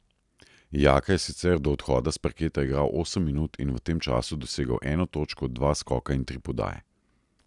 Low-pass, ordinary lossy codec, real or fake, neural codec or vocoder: 10.8 kHz; none; real; none